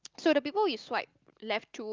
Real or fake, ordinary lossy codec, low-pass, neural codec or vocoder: real; Opus, 24 kbps; 7.2 kHz; none